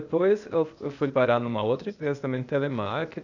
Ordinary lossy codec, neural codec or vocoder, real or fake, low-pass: none; codec, 16 kHz, 0.8 kbps, ZipCodec; fake; 7.2 kHz